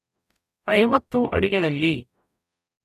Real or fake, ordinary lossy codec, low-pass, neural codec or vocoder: fake; none; 14.4 kHz; codec, 44.1 kHz, 0.9 kbps, DAC